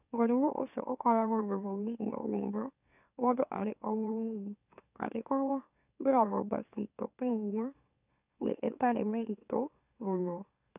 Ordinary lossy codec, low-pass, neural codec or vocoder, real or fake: none; 3.6 kHz; autoencoder, 44.1 kHz, a latent of 192 numbers a frame, MeloTTS; fake